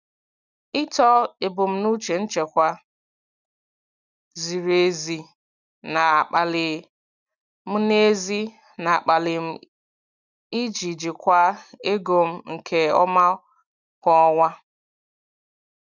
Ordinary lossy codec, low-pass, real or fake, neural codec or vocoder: none; 7.2 kHz; real; none